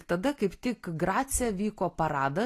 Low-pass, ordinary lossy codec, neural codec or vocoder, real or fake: 14.4 kHz; AAC, 48 kbps; none; real